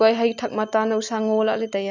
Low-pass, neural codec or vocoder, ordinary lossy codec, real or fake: 7.2 kHz; none; none; real